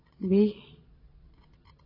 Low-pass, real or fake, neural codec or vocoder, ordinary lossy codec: 5.4 kHz; fake; vocoder, 22.05 kHz, 80 mel bands, Vocos; AAC, 24 kbps